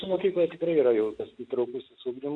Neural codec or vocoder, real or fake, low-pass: none; real; 9.9 kHz